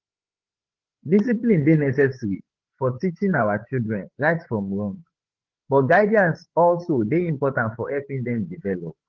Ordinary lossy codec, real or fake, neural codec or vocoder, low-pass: Opus, 16 kbps; fake; codec, 16 kHz, 8 kbps, FreqCodec, larger model; 7.2 kHz